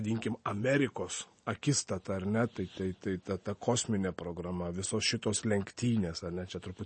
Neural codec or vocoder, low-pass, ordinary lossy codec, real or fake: none; 9.9 kHz; MP3, 32 kbps; real